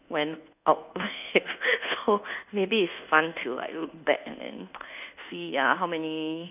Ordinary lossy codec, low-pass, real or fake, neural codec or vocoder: none; 3.6 kHz; fake; codec, 24 kHz, 1.2 kbps, DualCodec